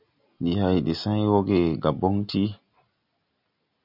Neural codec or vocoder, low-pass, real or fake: none; 5.4 kHz; real